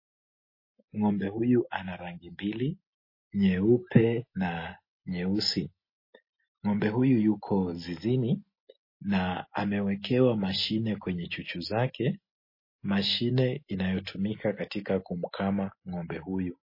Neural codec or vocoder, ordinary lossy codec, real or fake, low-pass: none; MP3, 24 kbps; real; 5.4 kHz